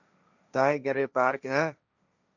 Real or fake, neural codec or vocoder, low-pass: fake; codec, 16 kHz, 1.1 kbps, Voila-Tokenizer; 7.2 kHz